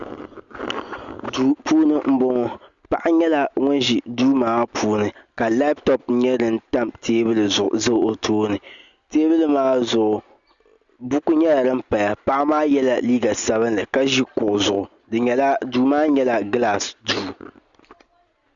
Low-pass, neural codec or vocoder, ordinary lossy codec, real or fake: 7.2 kHz; none; Opus, 64 kbps; real